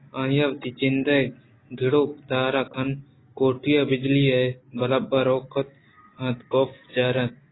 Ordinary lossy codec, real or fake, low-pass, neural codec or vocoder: AAC, 16 kbps; real; 7.2 kHz; none